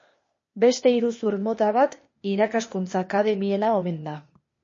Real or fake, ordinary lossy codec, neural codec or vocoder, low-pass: fake; MP3, 32 kbps; codec, 16 kHz, 0.8 kbps, ZipCodec; 7.2 kHz